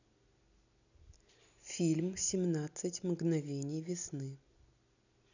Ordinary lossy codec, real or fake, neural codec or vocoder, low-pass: none; real; none; 7.2 kHz